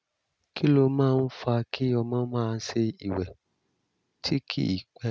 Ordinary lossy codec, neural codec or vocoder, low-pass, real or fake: none; none; none; real